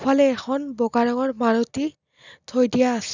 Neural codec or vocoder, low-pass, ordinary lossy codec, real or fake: none; 7.2 kHz; none; real